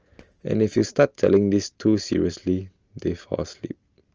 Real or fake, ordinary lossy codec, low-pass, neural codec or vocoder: real; Opus, 24 kbps; 7.2 kHz; none